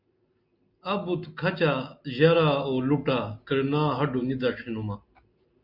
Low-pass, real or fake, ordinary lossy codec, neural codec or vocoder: 5.4 kHz; real; Opus, 64 kbps; none